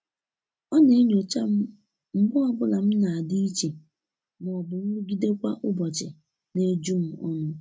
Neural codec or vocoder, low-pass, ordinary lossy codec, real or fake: none; none; none; real